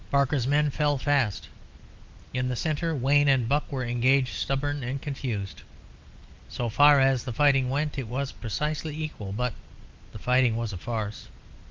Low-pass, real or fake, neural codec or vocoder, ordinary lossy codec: 7.2 kHz; real; none; Opus, 32 kbps